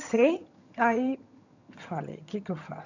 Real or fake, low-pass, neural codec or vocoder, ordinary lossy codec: fake; 7.2 kHz; vocoder, 22.05 kHz, 80 mel bands, HiFi-GAN; none